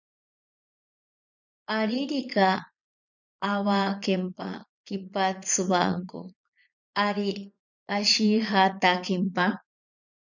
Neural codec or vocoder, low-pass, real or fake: vocoder, 22.05 kHz, 80 mel bands, Vocos; 7.2 kHz; fake